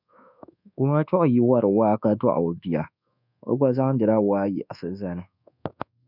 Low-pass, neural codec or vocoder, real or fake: 5.4 kHz; codec, 24 kHz, 1.2 kbps, DualCodec; fake